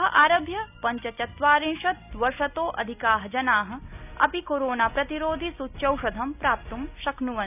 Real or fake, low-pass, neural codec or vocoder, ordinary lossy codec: real; 3.6 kHz; none; none